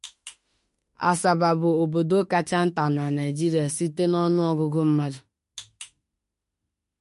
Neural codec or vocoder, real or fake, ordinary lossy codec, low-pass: autoencoder, 48 kHz, 32 numbers a frame, DAC-VAE, trained on Japanese speech; fake; MP3, 48 kbps; 14.4 kHz